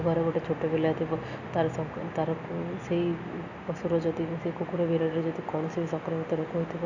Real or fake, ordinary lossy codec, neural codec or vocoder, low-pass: real; none; none; 7.2 kHz